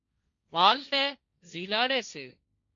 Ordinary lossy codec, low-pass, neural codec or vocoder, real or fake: MP3, 64 kbps; 7.2 kHz; codec, 16 kHz, 1.1 kbps, Voila-Tokenizer; fake